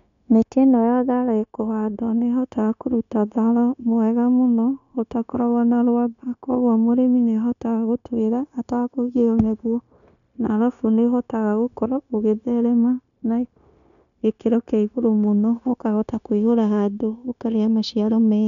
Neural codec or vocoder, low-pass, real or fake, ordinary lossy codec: codec, 16 kHz, 0.9 kbps, LongCat-Audio-Codec; 7.2 kHz; fake; none